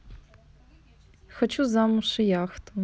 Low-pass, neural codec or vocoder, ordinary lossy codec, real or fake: none; none; none; real